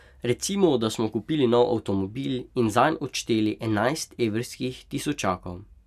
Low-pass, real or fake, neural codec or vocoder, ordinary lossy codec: 14.4 kHz; real; none; none